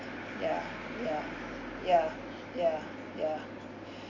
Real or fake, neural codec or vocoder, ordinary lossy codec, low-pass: real; none; none; 7.2 kHz